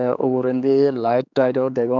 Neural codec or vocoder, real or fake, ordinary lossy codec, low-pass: codec, 16 kHz, 4 kbps, X-Codec, HuBERT features, trained on general audio; fake; none; 7.2 kHz